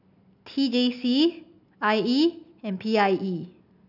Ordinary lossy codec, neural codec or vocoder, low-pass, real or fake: none; none; 5.4 kHz; real